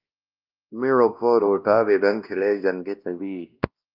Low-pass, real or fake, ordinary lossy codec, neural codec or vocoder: 5.4 kHz; fake; Opus, 24 kbps; codec, 16 kHz, 1 kbps, X-Codec, WavLM features, trained on Multilingual LibriSpeech